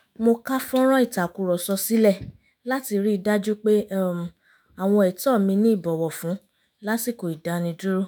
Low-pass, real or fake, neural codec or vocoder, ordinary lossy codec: none; fake; autoencoder, 48 kHz, 128 numbers a frame, DAC-VAE, trained on Japanese speech; none